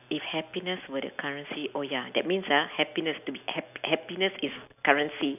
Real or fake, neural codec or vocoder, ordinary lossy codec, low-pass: real; none; none; 3.6 kHz